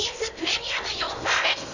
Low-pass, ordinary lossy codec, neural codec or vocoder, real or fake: 7.2 kHz; none; codec, 16 kHz in and 24 kHz out, 0.6 kbps, FocalCodec, streaming, 4096 codes; fake